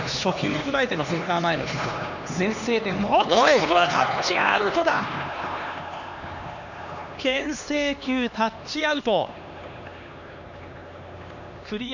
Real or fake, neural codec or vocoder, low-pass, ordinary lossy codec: fake; codec, 16 kHz, 2 kbps, X-Codec, HuBERT features, trained on LibriSpeech; 7.2 kHz; none